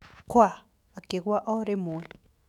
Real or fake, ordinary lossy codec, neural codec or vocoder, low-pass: fake; none; autoencoder, 48 kHz, 128 numbers a frame, DAC-VAE, trained on Japanese speech; 19.8 kHz